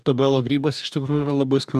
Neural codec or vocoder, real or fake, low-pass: codec, 44.1 kHz, 2.6 kbps, DAC; fake; 14.4 kHz